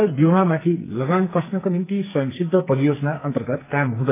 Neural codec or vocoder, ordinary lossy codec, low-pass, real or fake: codec, 44.1 kHz, 2.6 kbps, SNAC; AAC, 24 kbps; 3.6 kHz; fake